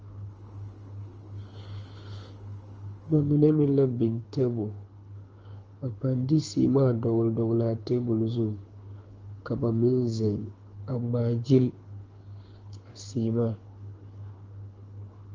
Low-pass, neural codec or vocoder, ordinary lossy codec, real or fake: 7.2 kHz; codec, 24 kHz, 6 kbps, HILCodec; Opus, 24 kbps; fake